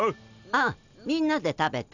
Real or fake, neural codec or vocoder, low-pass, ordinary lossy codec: real; none; 7.2 kHz; none